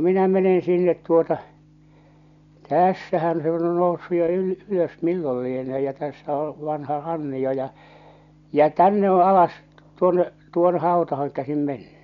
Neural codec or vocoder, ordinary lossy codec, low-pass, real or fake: none; none; 7.2 kHz; real